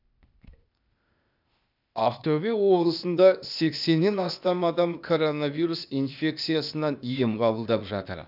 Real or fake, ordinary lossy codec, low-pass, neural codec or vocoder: fake; none; 5.4 kHz; codec, 16 kHz, 0.8 kbps, ZipCodec